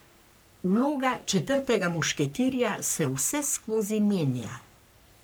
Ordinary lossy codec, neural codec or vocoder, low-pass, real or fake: none; codec, 44.1 kHz, 3.4 kbps, Pupu-Codec; none; fake